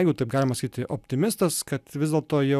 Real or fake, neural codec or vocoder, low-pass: real; none; 14.4 kHz